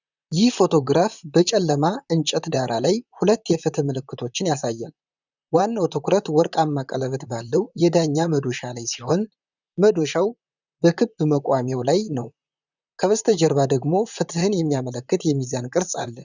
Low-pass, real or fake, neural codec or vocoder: 7.2 kHz; fake; vocoder, 44.1 kHz, 128 mel bands, Pupu-Vocoder